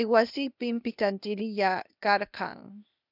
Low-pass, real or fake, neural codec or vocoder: 5.4 kHz; fake; codec, 16 kHz, 0.8 kbps, ZipCodec